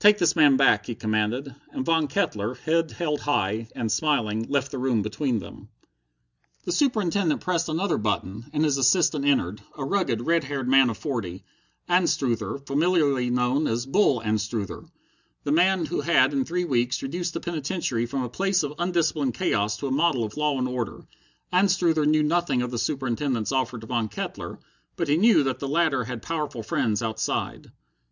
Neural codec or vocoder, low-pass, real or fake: none; 7.2 kHz; real